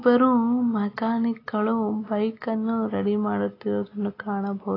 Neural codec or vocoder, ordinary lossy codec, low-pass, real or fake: none; AAC, 24 kbps; 5.4 kHz; real